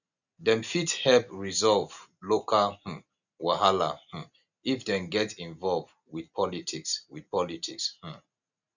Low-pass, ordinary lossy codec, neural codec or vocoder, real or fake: 7.2 kHz; none; none; real